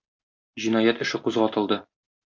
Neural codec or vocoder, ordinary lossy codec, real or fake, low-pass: none; MP3, 48 kbps; real; 7.2 kHz